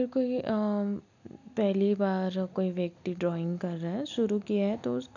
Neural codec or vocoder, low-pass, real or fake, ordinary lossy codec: none; 7.2 kHz; real; none